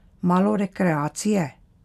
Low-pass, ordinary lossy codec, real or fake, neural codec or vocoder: 14.4 kHz; none; real; none